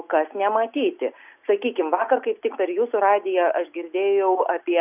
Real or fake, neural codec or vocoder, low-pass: real; none; 3.6 kHz